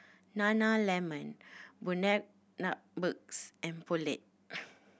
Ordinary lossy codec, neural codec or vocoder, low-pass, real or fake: none; none; none; real